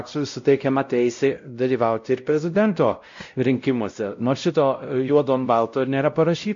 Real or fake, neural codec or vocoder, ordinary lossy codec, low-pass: fake; codec, 16 kHz, 0.5 kbps, X-Codec, WavLM features, trained on Multilingual LibriSpeech; MP3, 48 kbps; 7.2 kHz